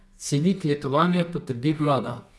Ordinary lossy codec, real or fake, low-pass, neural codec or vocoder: none; fake; none; codec, 24 kHz, 0.9 kbps, WavTokenizer, medium music audio release